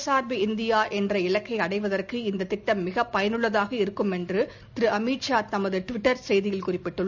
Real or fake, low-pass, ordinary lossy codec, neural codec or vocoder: real; 7.2 kHz; none; none